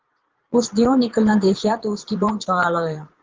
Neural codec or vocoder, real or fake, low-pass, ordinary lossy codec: vocoder, 22.05 kHz, 80 mel bands, Vocos; fake; 7.2 kHz; Opus, 16 kbps